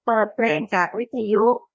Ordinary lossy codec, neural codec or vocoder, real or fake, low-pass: none; codec, 16 kHz, 1 kbps, FreqCodec, larger model; fake; none